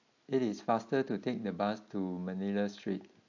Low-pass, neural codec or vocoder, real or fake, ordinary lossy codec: 7.2 kHz; none; real; AAC, 48 kbps